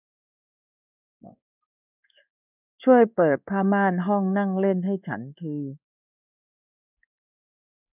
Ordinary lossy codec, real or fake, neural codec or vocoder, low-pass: none; fake; codec, 16 kHz in and 24 kHz out, 1 kbps, XY-Tokenizer; 3.6 kHz